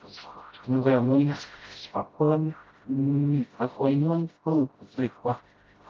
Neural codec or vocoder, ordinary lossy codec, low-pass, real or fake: codec, 16 kHz, 0.5 kbps, FreqCodec, smaller model; Opus, 24 kbps; 7.2 kHz; fake